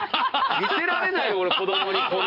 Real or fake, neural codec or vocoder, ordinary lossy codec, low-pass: fake; vocoder, 44.1 kHz, 128 mel bands every 512 samples, BigVGAN v2; none; 5.4 kHz